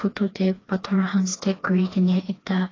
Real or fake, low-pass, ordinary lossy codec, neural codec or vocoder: fake; 7.2 kHz; AAC, 32 kbps; codec, 16 kHz, 2 kbps, FreqCodec, smaller model